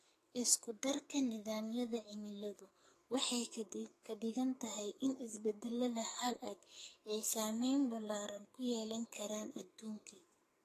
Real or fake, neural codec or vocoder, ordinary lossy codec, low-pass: fake; codec, 44.1 kHz, 2.6 kbps, SNAC; AAC, 48 kbps; 14.4 kHz